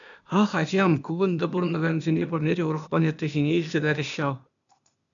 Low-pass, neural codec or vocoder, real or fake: 7.2 kHz; codec, 16 kHz, 0.8 kbps, ZipCodec; fake